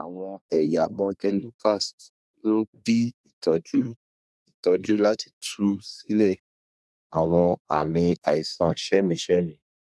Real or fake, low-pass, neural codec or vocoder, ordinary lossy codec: fake; none; codec, 24 kHz, 1 kbps, SNAC; none